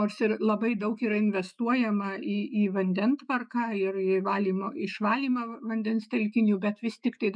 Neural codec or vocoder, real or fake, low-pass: autoencoder, 48 kHz, 128 numbers a frame, DAC-VAE, trained on Japanese speech; fake; 9.9 kHz